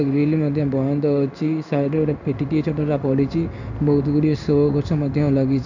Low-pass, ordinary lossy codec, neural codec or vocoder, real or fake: 7.2 kHz; none; codec, 16 kHz in and 24 kHz out, 1 kbps, XY-Tokenizer; fake